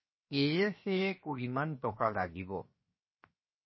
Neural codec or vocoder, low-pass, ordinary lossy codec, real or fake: codec, 16 kHz, 0.7 kbps, FocalCodec; 7.2 kHz; MP3, 24 kbps; fake